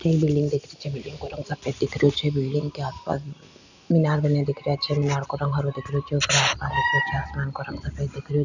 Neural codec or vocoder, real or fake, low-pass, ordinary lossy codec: none; real; 7.2 kHz; none